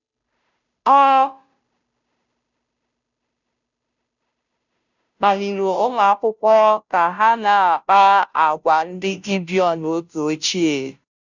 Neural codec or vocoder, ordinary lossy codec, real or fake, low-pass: codec, 16 kHz, 0.5 kbps, FunCodec, trained on Chinese and English, 25 frames a second; none; fake; 7.2 kHz